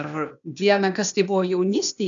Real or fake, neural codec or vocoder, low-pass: fake; codec, 16 kHz, 0.8 kbps, ZipCodec; 7.2 kHz